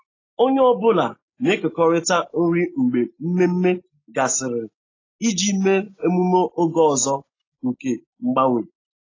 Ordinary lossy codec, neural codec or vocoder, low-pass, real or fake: AAC, 32 kbps; none; 7.2 kHz; real